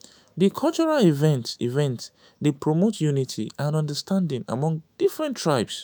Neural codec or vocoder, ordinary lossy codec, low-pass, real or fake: autoencoder, 48 kHz, 128 numbers a frame, DAC-VAE, trained on Japanese speech; none; none; fake